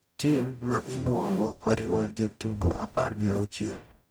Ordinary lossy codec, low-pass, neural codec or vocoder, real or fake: none; none; codec, 44.1 kHz, 0.9 kbps, DAC; fake